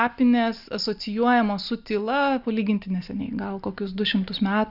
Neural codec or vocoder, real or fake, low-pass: none; real; 5.4 kHz